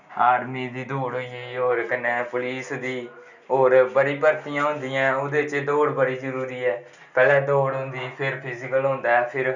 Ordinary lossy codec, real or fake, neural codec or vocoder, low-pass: none; real; none; 7.2 kHz